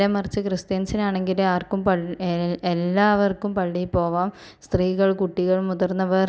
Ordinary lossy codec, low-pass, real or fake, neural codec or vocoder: none; none; real; none